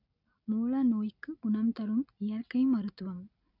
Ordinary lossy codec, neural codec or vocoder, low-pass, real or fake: none; none; 5.4 kHz; real